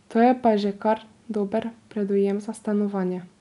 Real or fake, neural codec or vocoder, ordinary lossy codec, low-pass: real; none; none; 10.8 kHz